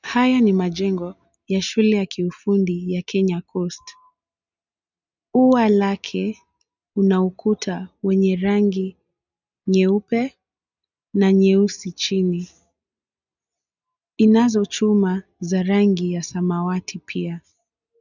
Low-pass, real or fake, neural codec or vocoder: 7.2 kHz; real; none